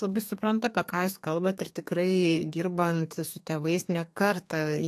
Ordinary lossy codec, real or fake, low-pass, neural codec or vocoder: AAC, 64 kbps; fake; 14.4 kHz; codec, 32 kHz, 1.9 kbps, SNAC